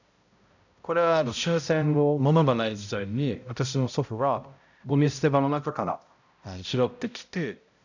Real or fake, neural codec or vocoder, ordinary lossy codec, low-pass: fake; codec, 16 kHz, 0.5 kbps, X-Codec, HuBERT features, trained on balanced general audio; none; 7.2 kHz